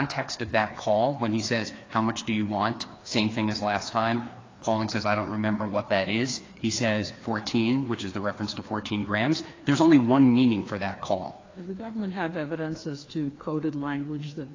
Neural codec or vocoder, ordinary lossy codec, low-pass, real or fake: codec, 16 kHz, 2 kbps, FreqCodec, larger model; AAC, 32 kbps; 7.2 kHz; fake